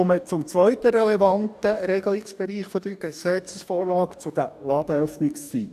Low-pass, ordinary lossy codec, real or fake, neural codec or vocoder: 14.4 kHz; none; fake; codec, 44.1 kHz, 2.6 kbps, DAC